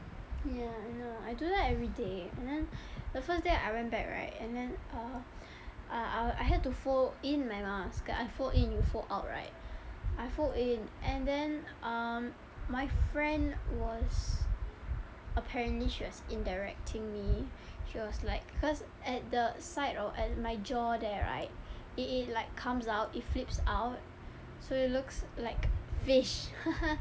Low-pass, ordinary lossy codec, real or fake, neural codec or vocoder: none; none; real; none